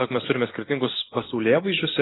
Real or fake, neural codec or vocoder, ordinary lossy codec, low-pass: real; none; AAC, 16 kbps; 7.2 kHz